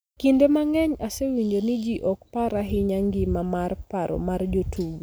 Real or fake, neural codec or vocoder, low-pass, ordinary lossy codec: real; none; none; none